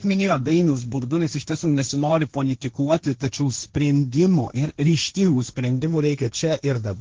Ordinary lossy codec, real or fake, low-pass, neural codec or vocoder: Opus, 16 kbps; fake; 7.2 kHz; codec, 16 kHz, 1.1 kbps, Voila-Tokenizer